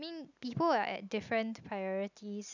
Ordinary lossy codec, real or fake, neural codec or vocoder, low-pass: none; real; none; 7.2 kHz